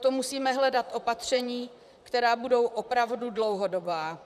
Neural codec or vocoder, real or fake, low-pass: vocoder, 44.1 kHz, 128 mel bands, Pupu-Vocoder; fake; 14.4 kHz